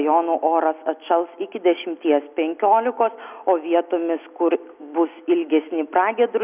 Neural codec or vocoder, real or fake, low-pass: none; real; 3.6 kHz